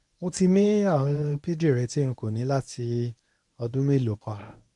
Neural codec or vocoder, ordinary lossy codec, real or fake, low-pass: codec, 24 kHz, 0.9 kbps, WavTokenizer, medium speech release version 1; none; fake; 10.8 kHz